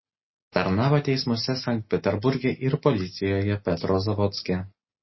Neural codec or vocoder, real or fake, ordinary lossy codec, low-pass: none; real; MP3, 24 kbps; 7.2 kHz